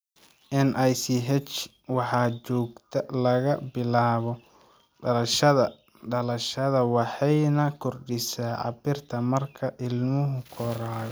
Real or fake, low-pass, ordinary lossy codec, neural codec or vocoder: real; none; none; none